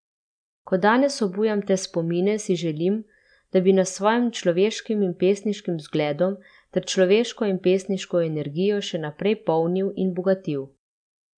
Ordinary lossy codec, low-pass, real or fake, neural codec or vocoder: none; 9.9 kHz; real; none